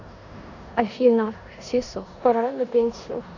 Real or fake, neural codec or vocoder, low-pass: fake; codec, 16 kHz in and 24 kHz out, 0.9 kbps, LongCat-Audio-Codec, four codebook decoder; 7.2 kHz